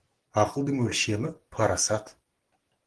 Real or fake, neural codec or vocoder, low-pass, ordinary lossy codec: real; none; 10.8 kHz; Opus, 16 kbps